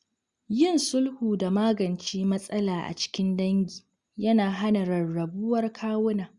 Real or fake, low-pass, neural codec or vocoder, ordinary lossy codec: real; 10.8 kHz; none; Opus, 64 kbps